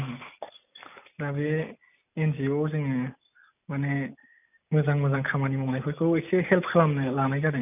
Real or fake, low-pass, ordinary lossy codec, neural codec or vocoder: real; 3.6 kHz; none; none